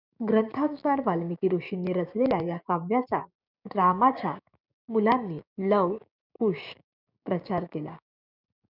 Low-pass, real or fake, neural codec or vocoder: 5.4 kHz; fake; codec, 16 kHz, 6 kbps, DAC